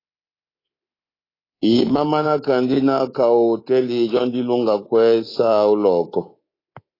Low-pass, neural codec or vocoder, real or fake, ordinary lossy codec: 5.4 kHz; codec, 24 kHz, 3.1 kbps, DualCodec; fake; AAC, 24 kbps